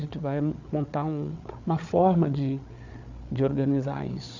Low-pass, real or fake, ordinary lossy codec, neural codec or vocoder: 7.2 kHz; fake; none; codec, 16 kHz, 8 kbps, FreqCodec, larger model